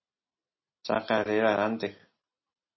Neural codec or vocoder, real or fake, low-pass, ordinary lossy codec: none; real; 7.2 kHz; MP3, 24 kbps